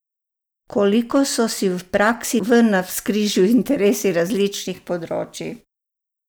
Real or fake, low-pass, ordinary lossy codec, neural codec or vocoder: real; none; none; none